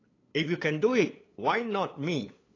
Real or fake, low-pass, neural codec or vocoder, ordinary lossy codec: fake; 7.2 kHz; codec, 16 kHz, 8 kbps, FunCodec, trained on LibriTTS, 25 frames a second; AAC, 32 kbps